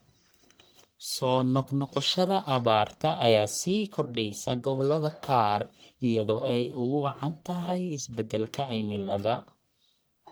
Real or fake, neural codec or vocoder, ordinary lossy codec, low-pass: fake; codec, 44.1 kHz, 1.7 kbps, Pupu-Codec; none; none